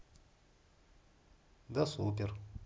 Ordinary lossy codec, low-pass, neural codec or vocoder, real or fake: none; none; none; real